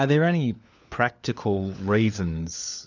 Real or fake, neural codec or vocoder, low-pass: fake; codec, 16 kHz, 4 kbps, FunCodec, trained on LibriTTS, 50 frames a second; 7.2 kHz